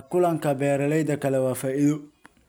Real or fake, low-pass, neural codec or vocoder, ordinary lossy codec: real; none; none; none